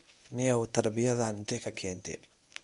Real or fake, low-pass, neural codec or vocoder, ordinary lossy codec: fake; 10.8 kHz; codec, 24 kHz, 0.9 kbps, WavTokenizer, medium speech release version 1; none